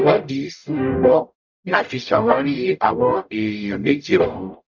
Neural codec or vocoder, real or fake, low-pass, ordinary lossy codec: codec, 44.1 kHz, 0.9 kbps, DAC; fake; 7.2 kHz; none